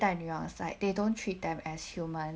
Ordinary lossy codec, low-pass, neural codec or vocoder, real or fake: none; none; none; real